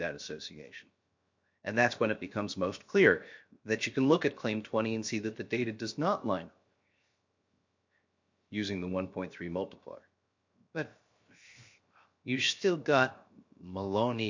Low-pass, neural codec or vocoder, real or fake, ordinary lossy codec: 7.2 kHz; codec, 16 kHz, 0.7 kbps, FocalCodec; fake; MP3, 48 kbps